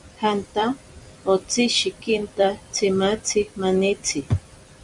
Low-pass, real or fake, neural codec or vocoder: 10.8 kHz; fake; vocoder, 44.1 kHz, 128 mel bands every 256 samples, BigVGAN v2